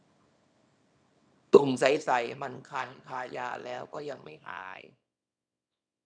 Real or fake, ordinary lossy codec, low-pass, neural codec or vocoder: fake; none; 9.9 kHz; codec, 24 kHz, 0.9 kbps, WavTokenizer, small release